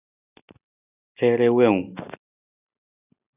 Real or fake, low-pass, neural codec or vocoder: real; 3.6 kHz; none